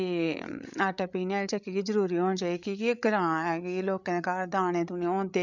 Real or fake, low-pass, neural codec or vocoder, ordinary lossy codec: fake; 7.2 kHz; codec, 16 kHz, 8 kbps, FreqCodec, larger model; none